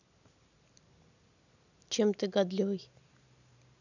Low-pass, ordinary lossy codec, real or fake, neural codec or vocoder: 7.2 kHz; none; real; none